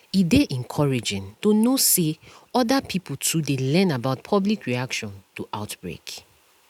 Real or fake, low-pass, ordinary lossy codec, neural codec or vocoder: real; 19.8 kHz; none; none